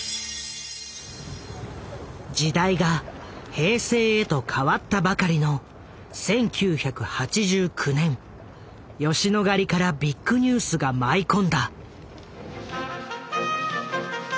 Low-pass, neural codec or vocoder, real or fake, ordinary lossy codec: none; none; real; none